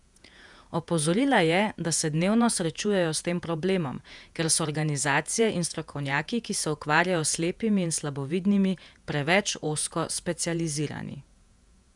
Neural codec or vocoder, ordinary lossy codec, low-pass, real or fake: vocoder, 48 kHz, 128 mel bands, Vocos; none; 10.8 kHz; fake